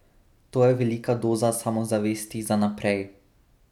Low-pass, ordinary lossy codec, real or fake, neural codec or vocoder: 19.8 kHz; none; real; none